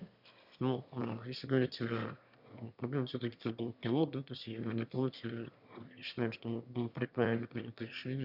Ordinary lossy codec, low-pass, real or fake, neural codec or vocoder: none; 5.4 kHz; fake; autoencoder, 22.05 kHz, a latent of 192 numbers a frame, VITS, trained on one speaker